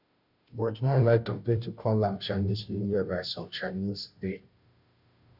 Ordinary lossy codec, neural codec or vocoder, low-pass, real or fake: none; codec, 16 kHz, 0.5 kbps, FunCodec, trained on Chinese and English, 25 frames a second; 5.4 kHz; fake